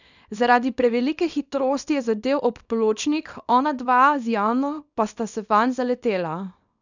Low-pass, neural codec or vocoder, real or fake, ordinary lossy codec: 7.2 kHz; codec, 24 kHz, 0.9 kbps, WavTokenizer, small release; fake; none